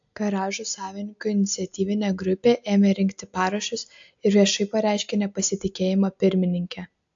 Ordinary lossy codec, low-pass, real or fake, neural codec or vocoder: AAC, 64 kbps; 7.2 kHz; real; none